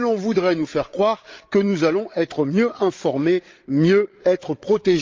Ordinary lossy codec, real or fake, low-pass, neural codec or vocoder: Opus, 32 kbps; fake; 7.2 kHz; codec, 16 kHz, 8 kbps, FreqCodec, larger model